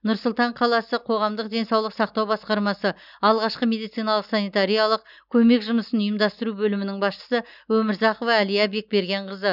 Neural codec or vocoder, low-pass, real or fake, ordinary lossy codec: none; 5.4 kHz; real; none